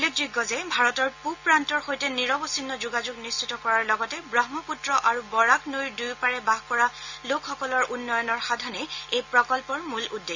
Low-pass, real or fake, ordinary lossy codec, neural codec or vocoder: 7.2 kHz; real; Opus, 64 kbps; none